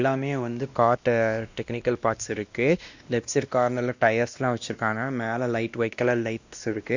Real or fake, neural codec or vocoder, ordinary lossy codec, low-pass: fake; codec, 16 kHz, 1 kbps, X-Codec, WavLM features, trained on Multilingual LibriSpeech; Opus, 64 kbps; 7.2 kHz